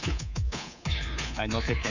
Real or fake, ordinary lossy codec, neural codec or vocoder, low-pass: fake; none; codec, 24 kHz, 3.1 kbps, DualCodec; 7.2 kHz